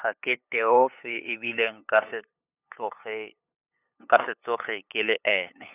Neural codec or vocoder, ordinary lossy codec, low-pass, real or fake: codec, 16 kHz, 8 kbps, FunCodec, trained on LibriTTS, 25 frames a second; none; 3.6 kHz; fake